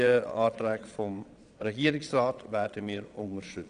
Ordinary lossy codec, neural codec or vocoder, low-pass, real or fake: none; vocoder, 22.05 kHz, 80 mel bands, Vocos; 9.9 kHz; fake